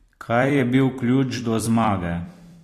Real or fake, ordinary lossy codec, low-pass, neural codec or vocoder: fake; AAC, 48 kbps; 14.4 kHz; vocoder, 44.1 kHz, 128 mel bands every 256 samples, BigVGAN v2